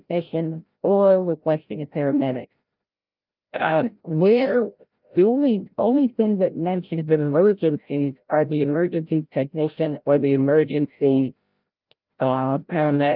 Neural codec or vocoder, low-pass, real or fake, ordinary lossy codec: codec, 16 kHz, 0.5 kbps, FreqCodec, larger model; 5.4 kHz; fake; Opus, 24 kbps